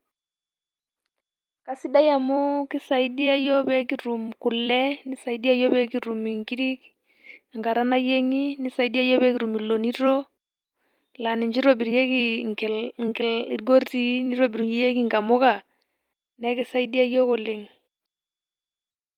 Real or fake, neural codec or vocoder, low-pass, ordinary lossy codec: fake; vocoder, 44.1 kHz, 128 mel bands every 256 samples, BigVGAN v2; 19.8 kHz; Opus, 32 kbps